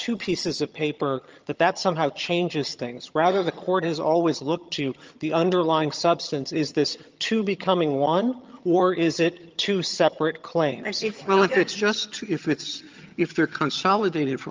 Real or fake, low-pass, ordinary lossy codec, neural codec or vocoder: fake; 7.2 kHz; Opus, 24 kbps; vocoder, 22.05 kHz, 80 mel bands, HiFi-GAN